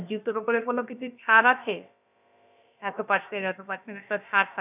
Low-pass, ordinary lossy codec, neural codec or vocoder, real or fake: 3.6 kHz; none; codec, 16 kHz, about 1 kbps, DyCAST, with the encoder's durations; fake